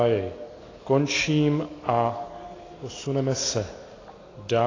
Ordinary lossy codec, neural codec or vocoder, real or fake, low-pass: AAC, 32 kbps; none; real; 7.2 kHz